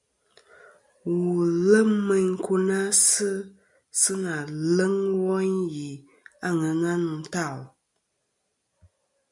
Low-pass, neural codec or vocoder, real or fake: 10.8 kHz; none; real